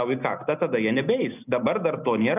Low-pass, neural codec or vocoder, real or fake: 3.6 kHz; none; real